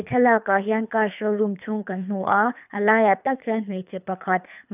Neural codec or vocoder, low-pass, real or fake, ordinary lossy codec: codec, 24 kHz, 3 kbps, HILCodec; 3.6 kHz; fake; none